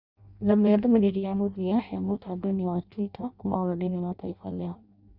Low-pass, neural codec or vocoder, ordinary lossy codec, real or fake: 5.4 kHz; codec, 16 kHz in and 24 kHz out, 0.6 kbps, FireRedTTS-2 codec; Opus, 64 kbps; fake